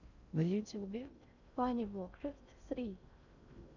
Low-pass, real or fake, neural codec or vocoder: 7.2 kHz; fake; codec, 16 kHz in and 24 kHz out, 0.6 kbps, FocalCodec, streaming, 4096 codes